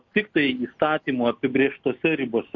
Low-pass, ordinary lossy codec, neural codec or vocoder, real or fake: 7.2 kHz; MP3, 48 kbps; none; real